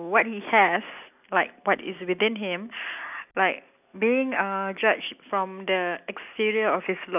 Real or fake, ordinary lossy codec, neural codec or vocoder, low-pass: real; none; none; 3.6 kHz